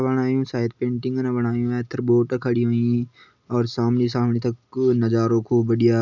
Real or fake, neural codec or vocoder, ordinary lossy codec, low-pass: real; none; none; 7.2 kHz